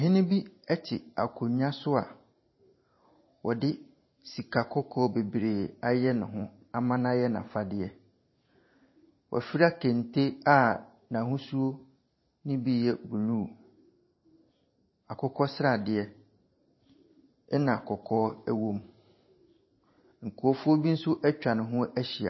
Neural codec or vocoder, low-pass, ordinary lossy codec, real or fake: vocoder, 44.1 kHz, 128 mel bands every 512 samples, BigVGAN v2; 7.2 kHz; MP3, 24 kbps; fake